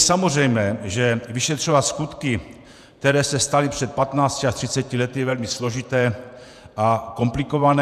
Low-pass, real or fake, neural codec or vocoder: 14.4 kHz; fake; vocoder, 48 kHz, 128 mel bands, Vocos